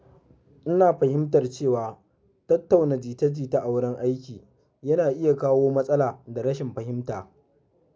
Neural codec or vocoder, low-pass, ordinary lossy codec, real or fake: none; none; none; real